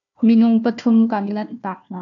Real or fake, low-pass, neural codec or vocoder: fake; 7.2 kHz; codec, 16 kHz, 1 kbps, FunCodec, trained on Chinese and English, 50 frames a second